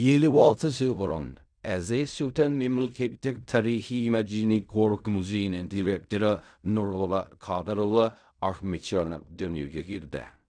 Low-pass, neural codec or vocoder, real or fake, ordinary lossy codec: 9.9 kHz; codec, 16 kHz in and 24 kHz out, 0.4 kbps, LongCat-Audio-Codec, fine tuned four codebook decoder; fake; none